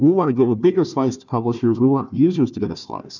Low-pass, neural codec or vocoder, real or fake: 7.2 kHz; codec, 16 kHz, 1 kbps, FunCodec, trained on Chinese and English, 50 frames a second; fake